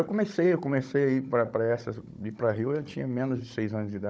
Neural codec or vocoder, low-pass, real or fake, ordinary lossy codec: codec, 16 kHz, 16 kbps, FunCodec, trained on Chinese and English, 50 frames a second; none; fake; none